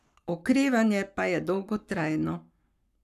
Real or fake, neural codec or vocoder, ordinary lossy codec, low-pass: fake; codec, 44.1 kHz, 7.8 kbps, Pupu-Codec; none; 14.4 kHz